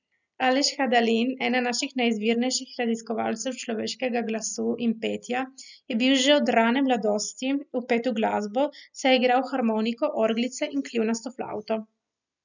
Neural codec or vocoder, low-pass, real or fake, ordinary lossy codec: none; 7.2 kHz; real; none